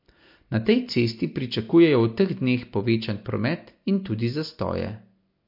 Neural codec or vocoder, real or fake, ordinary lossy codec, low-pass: none; real; MP3, 32 kbps; 5.4 kHz